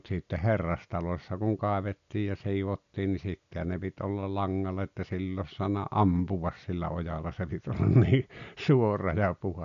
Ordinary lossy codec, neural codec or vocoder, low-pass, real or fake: none; none; 7.2 kHz; real